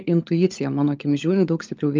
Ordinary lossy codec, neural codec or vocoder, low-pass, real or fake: Opus, 24 kbps; codec, 16 kHz, 16 kbps, FunCodec, trained on LibriTTS, 50 frames a second; 7.2 kHz; fake